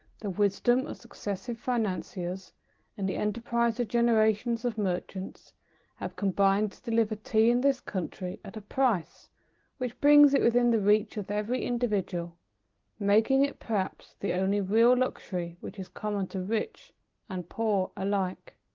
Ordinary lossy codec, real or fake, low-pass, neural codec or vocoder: Opus, 16 kbps; real; 7.2 kHz; none